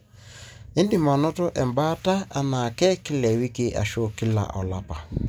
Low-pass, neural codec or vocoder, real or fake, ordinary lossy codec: none; none; real; none